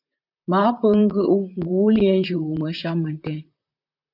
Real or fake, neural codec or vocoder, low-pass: fake; vocoder, 44.1 kHz, 128 mel bands, Pupu-Vocoder; 5.4 kHz